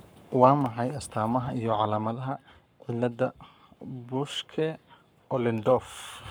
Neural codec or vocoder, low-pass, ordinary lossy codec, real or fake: codec, 44.1 kHz, 7.8 kbps, Pupu-Codec; none; none; fake